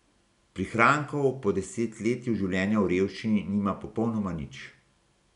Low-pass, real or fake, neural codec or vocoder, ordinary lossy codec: 10.8 kHz; real; none; none